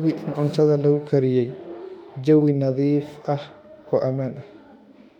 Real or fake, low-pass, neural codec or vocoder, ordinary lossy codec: fake; 19.8 kHz; autoencoder, 48 kHz, 32 numbers a frame, DAC-VAE, trained on Japanese speech; none